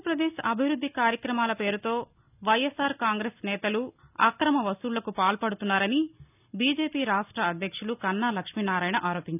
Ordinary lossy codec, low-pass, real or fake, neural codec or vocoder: none; 3.6 kHz; real; none